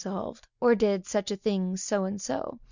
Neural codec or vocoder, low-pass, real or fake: none; 7.2 kHz; real